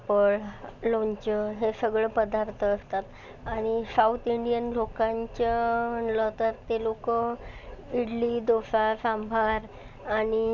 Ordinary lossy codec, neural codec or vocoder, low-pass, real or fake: none; none; 7.2 kHz; real